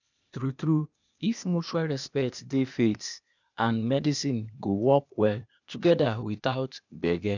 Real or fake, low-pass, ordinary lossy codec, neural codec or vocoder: fake; 7.2 kHz; none; codec, 16 kHz, 0.8 kbps, ZipCodec